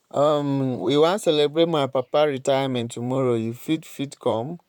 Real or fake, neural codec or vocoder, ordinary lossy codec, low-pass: fake; vocoder, 44.1 kHz, 128 mel bands, Pupu-Vocoder; none; 19.8 kHz